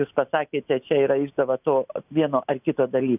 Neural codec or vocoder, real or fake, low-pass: none; real; 3.6 kHz